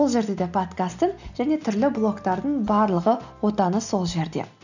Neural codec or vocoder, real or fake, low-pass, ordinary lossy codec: none; real; 7.2 kHz; none